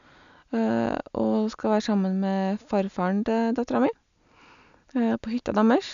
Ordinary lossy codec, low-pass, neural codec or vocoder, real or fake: none; 7.2 kHz; none; real